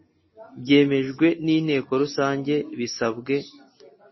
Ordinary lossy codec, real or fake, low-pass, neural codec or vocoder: MP3, 24 kbps; real; 7.2 kHz; none